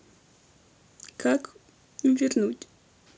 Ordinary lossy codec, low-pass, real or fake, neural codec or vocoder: none; none; real; none